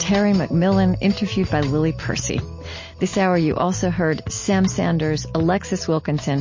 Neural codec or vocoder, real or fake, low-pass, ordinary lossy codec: none; real; 7.2 kHz; MP3, 32 kbps